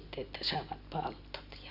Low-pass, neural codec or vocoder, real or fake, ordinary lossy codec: 5.4 kHz; none; real; none